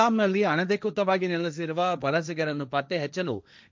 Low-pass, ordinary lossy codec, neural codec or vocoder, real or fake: none; none; codec, 16 kHz, 1.1 kbps, Voila-Tokenizer; fake